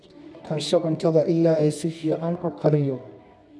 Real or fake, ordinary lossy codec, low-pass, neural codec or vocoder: fake; none; none; codec, 24 kHz, 0.9 kbps, WavTokenizer, medium music audio release